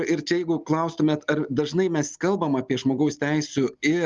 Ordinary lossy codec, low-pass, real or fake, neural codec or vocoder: Opus, 32 kbps; 7.2 kHz; real; none